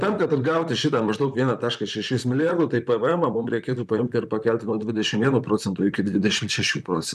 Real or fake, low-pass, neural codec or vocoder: fake; 14.4 kHz; vocoder, 44.1 kHz, 128 mel bands, Pupu-Vocoder